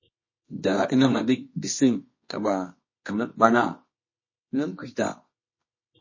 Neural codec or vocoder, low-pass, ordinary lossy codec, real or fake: codec, 24 kHz, 0.9 kbps, WavTokenizer, medium music audio release; 7.2 kHz; MP3, 32 kbps; fake